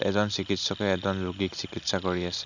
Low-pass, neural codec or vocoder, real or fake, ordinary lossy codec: 7.2 kHz; none; real; none